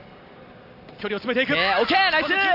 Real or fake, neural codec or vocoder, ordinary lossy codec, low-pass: real; none; Opus, 64 kbps; 5.4 kHz